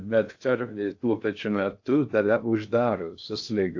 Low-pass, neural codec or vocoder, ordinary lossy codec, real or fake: 7.2 kHz; codec, 16 kHz in and 24 kHz out, 0.6 kbps, FocalCodec, streaming, 2048 codes; AAC, 48 kbps; fake